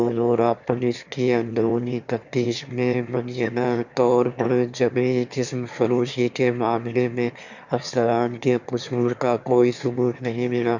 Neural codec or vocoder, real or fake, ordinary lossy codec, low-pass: autoencoder, 22.05 kHz, a latent of 192 numbers a frame, VITS, trained on one speaker; fake; none; 7.2 kHz